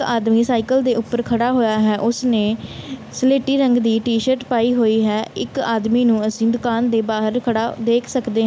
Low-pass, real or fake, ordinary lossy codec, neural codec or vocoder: none; real; none; none